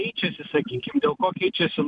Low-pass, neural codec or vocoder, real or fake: 10.8 kHz; vocoder, 44.1 kHz, 128 mel bands every 256 samples, BigVGAN v2; fake